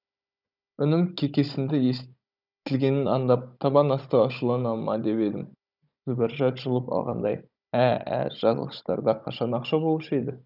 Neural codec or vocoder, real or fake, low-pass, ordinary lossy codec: codec, 16 kHz, 16 kbps, FunCodec, trained on Chinese and English, 50 frames a second; fake; 5.4 kHz; none